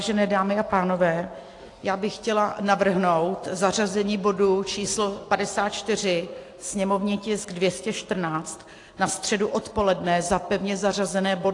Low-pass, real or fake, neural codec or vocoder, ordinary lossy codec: 10.8 kHz; real; none; AAC, 48 kbps